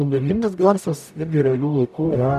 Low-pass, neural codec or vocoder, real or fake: 14.4 kHz; codec, 44.1 kHz, 0.9 kbps, DAC; fake